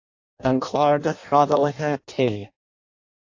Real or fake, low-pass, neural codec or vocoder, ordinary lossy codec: fake; 7.2 kHz; codec, 16 kHz in and 24 kHz out, 0.6 kbps, FireRedTTS-2 codec; AAC, 48 kbps